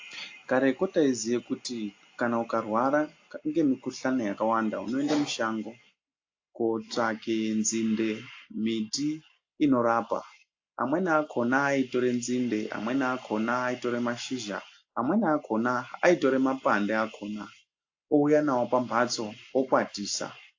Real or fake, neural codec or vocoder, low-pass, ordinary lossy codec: real; none; 7.2 kHz; AAC, 48 kbps